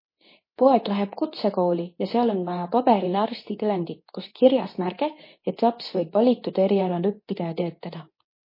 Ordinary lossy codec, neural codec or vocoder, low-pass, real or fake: MP3, 24 kbps; codec, 24 kHz, 0.9 kbps, WavTokenizer, medium speech release version 2; 5.4 kHz; fake